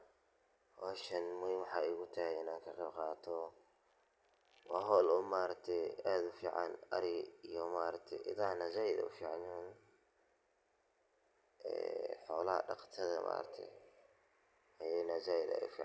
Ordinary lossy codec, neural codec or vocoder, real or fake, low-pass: none; none; real; none